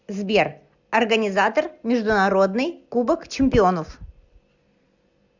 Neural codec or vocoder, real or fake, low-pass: none; real; 7.2 kHz